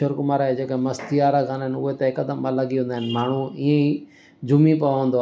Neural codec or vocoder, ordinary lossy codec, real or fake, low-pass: none; none; real; none